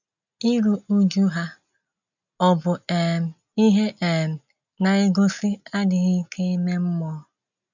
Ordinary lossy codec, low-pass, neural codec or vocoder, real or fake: none; 7.2 kHz; none; real